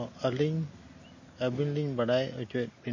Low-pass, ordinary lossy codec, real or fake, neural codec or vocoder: 7.2 kHz; MP3, 32 kbps; real; none